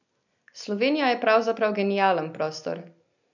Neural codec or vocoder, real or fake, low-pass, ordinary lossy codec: none; real; 7.2 kHz; none